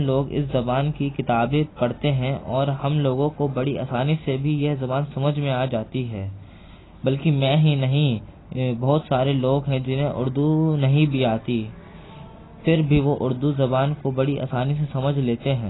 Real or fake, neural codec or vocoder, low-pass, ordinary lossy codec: real; none; 7.2 kHz; AAC, 16 kbps